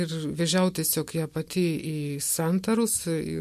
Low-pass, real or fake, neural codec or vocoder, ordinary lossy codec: 14.4 kHz; real; none; MP3, 64 kbps